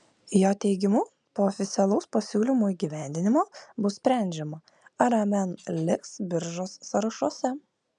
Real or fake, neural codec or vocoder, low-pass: real; none; 10.8 kHz